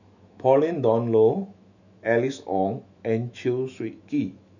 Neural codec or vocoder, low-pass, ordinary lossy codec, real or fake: autoencoder, 48 kHz, 128 numbers a frame, DAC-VAE, trained on Japanese speech; 7.2 kHz; none; fake